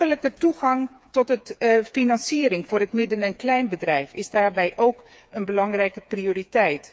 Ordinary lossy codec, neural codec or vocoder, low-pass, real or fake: none; codec, 16 kHz, 4 kbps, FreqCodec, smaller model; none; fake